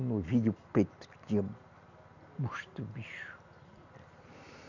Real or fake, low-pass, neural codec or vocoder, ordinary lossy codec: real; 7.2 kHz; none; none